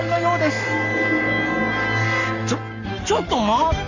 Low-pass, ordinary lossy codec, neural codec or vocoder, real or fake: 7.2 kHz; none; codec, 44.1 kHz, 7.8 kbps, Pupu-Codec; fake